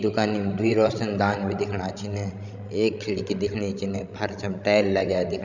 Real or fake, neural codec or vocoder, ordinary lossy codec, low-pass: fake; codec, 16 kHz, 16 kbps, FreqCodec, larger model; none; 7.2 kHz